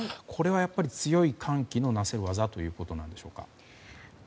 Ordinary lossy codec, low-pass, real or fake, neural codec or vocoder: none; none; real; none